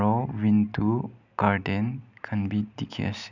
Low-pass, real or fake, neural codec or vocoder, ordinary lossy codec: 7.2 kHz; real; none; none